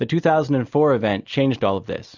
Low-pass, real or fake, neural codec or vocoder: 7.2 kHz; real; none